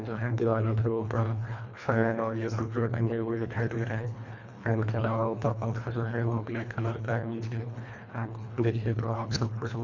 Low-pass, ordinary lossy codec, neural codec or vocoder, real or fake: 7.2 kHz; none; codec, 24 kHz, 1.5 kbps, HILCodec; fake